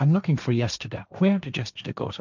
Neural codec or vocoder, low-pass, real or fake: codec, 16 kHz, 1.1 kbps, Voila-Tokenizer; 7.2 kHz; fake